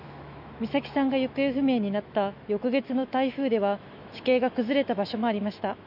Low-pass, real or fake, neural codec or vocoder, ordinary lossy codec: 5.4 kHz; fake; autoencoder, 48 kHz, 128 numbers a frame, DAC-VAE, trained on Japanese speech; none